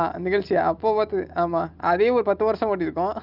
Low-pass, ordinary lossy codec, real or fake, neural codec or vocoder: 5.4 kHz; Opus, 32 kbps; real; none